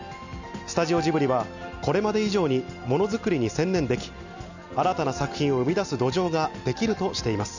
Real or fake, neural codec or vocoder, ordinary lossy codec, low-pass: real; none; none; 7.2 kHz